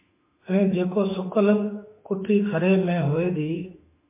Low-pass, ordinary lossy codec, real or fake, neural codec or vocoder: 3.6 kHz; MP3, 24 kbps; fake; autoencoder, 48 kHz, 32 numbers a frame, DAC-VAE, trained on Japanese speech